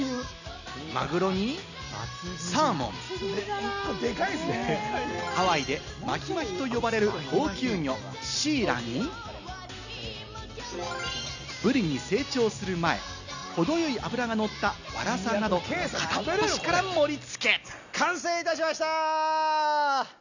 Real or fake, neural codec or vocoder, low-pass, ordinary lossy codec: real; none; 7.2 kHz; none